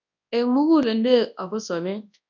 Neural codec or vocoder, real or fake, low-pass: codec, 24 kHz, 0.9 kbps, WavTokenizer, large speech release; fake; 7.2 kHz